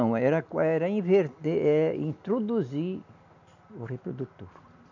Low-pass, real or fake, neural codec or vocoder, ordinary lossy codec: 7.2 kHz; real; none; none